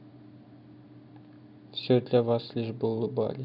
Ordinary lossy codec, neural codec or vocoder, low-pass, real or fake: none; none; 5.4 kHz; real